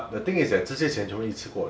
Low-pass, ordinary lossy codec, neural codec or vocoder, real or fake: none; none; none; real